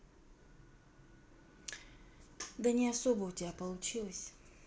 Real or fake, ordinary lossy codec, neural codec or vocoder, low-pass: real; none; none; none